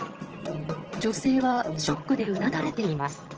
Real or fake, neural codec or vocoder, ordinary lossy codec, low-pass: fake; vocoder, 22.05 kHz, 80 mel bands, HiFi-GAN; Opus, 16 kbps; 7.2 kHz